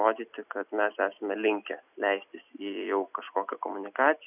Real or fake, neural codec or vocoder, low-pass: real; none; 3.6 kHz